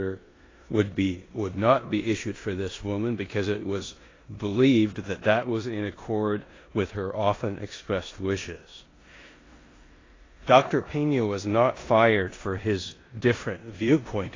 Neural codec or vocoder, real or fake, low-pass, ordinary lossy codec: codec, 16 kHz in and 24 kHz out, 0.9 kbps, LongCat-Audio-Codec, four codebook decoder; fake; 7.2 kHz; AAC, 32 kbps